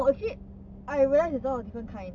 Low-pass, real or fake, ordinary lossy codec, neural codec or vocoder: 7.2 kHz; real; none; none